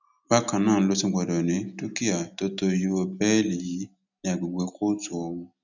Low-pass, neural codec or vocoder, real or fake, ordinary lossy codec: 7.2 kHz; none; real; none